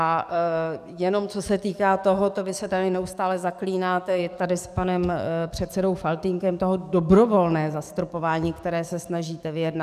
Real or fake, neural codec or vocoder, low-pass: fake; codec, 44.1 kHz, 7.8 kbps, DAC; 14.4 kHz